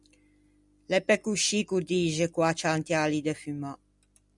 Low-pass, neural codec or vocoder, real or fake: 10.8 kHz; none; real